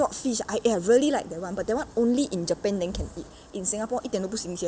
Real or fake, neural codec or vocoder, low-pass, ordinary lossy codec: real; none; none; none